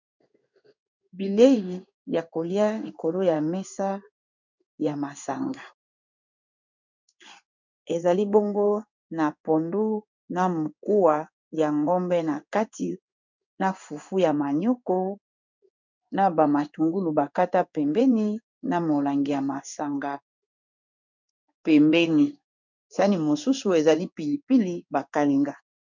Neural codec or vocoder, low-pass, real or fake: codec, 16 kHz in and 24 kHz out, 1 kbps, XY-Tokenizer; 7.2 kHz; fake